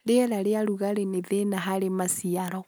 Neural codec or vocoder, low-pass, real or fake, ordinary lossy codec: none; none; real; none